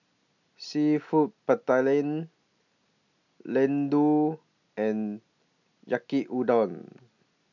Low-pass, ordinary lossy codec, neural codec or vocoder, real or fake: 7.2 kHz; none; none; real